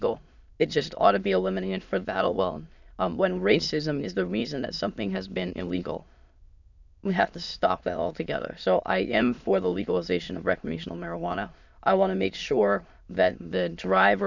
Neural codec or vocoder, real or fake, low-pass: autoencoder, 22.05 kHz, a latent of 192 numbers a frame, VITS, trained on many speakers; fake; 7.2 kHz